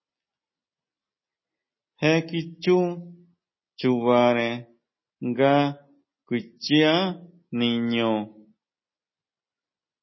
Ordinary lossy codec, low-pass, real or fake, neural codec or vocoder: MP3, 24 kbps; 7.2 kHz; real; none